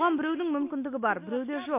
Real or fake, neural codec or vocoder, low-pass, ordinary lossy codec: real; none; 3.6 kHz; MP3, 24 kbps